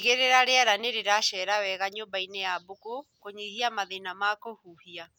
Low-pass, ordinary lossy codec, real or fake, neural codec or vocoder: none; none; real; none